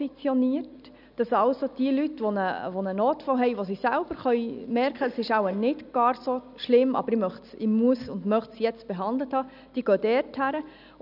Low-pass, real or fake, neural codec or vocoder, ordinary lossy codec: 5.4 kHz; real; none; none